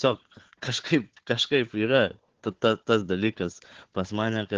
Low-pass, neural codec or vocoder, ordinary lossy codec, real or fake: 7.2 kHz; codec, 16 kHz, 4 kbps, FunCodec, trained on Chinese and English, 50 frames a second; Opus, 16 kbps; fake